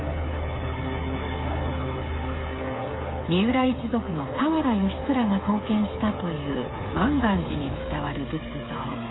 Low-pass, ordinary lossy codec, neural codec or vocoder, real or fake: 7.2 kHz; AAC, 16 kbps; codec, 16 kHz, 8 kbps, FreqCodec, smaller model; fake